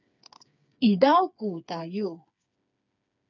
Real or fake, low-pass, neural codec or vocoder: fake; 7.2 kHz; codec, 16 kHz, 4 kbps, FreqCodec, smaller model